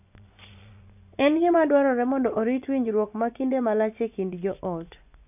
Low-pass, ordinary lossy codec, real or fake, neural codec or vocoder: 3.6 kHz; none; fake; vocoder, 24 kHz, 100 mel bands, Vocos